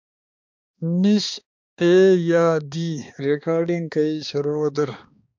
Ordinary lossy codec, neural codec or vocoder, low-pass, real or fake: MP3, 64 kbps; codec, 16 kHz, 2 kbps, X-Codec, HuBERT features, trained on balanced general audio; 7.2 kHz; fake